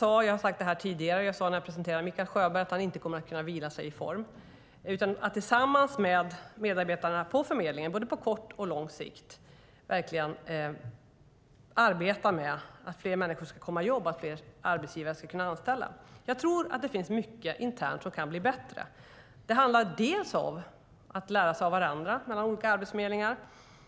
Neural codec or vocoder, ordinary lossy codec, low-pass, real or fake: none; none; none; real